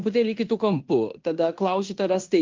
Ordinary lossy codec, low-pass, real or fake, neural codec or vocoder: Opus, 32 kbps; 7.2 kHz; fake; codec, 16 kHz in and 24 kHz out, 0.9 kbps, LongCat-Audio-Codec, fine tuned four codebook decoder